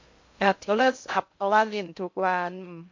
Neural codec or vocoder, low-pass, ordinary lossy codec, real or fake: codec, 16 kHz in and 24 kHz out, 0.6 kbps, FocalCodec, streaming, 2048 codes; 7.2 kHz; MP3, 48 kbps; fake